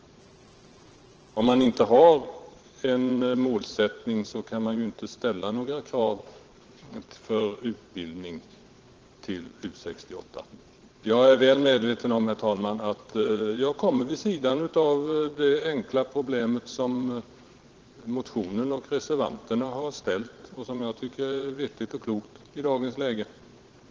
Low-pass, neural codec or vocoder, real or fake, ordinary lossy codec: 7.2 kHz; vocoder, 22.05 kHz, 80 mel bands, Vocos; fake; Opus, 16 kbps